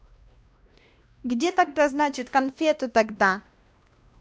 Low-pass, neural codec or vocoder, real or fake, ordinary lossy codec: none; codec, 16 kHz, 1 kbps, X-Codec, WavLM features, trained on Multilingual LibriSpeech; fake; none